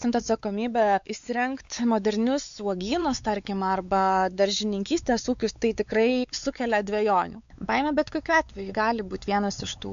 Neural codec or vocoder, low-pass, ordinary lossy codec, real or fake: codec, 16 kHz, 4 kbps, X-Codec, WavLM features, trained on Multilingual LibriSpeech; 7.2 kHz; AAC, 96 kbps; fake